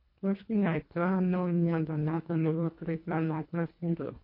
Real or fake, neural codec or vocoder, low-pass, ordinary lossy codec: fake; codec, 24 kHz, 1.5 kbps, HILCodec; 5.4 kHz; MP3, 32 kbps